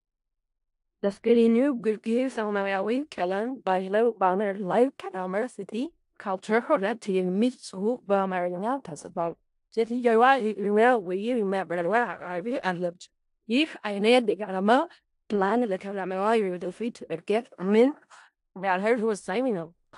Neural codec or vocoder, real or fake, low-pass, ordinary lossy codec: codec, 16 kHz in and 24 kHz out, 0.4 kbps, LongCat-Audio-Codec, four codebook decoder; fake; 10.8 kHz; AAC, 64 kbps